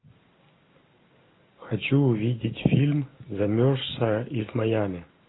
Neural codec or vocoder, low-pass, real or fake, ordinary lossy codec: none; 7.2 kHz; real; AAC, 16 kbps